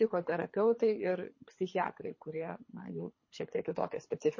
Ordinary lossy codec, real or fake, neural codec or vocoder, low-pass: MP3, 32 kbps; fake; codec, 24 kHz, 3 kbps, HILCodec; 7.2 kHz